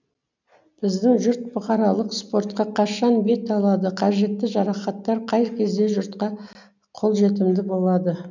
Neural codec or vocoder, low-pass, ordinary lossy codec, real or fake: none; 7.2 kHz; none; real